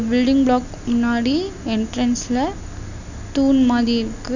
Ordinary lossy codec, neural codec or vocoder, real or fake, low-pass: none; none; real; 7.2 kHz